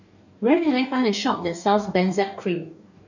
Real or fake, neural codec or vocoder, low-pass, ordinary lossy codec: fake; codec, 44.1 kHz, 2.6 kbps, DAC; 7.2 kHz; none